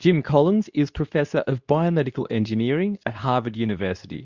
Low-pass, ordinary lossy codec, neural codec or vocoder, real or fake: 7.2 kHz; Opus, 64 kbps; codec, 24 kHz, 0.9 kbps, WavTokenizer, medium speech release version 2; fake